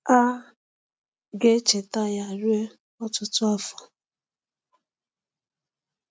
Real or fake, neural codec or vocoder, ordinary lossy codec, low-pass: real; none; none; none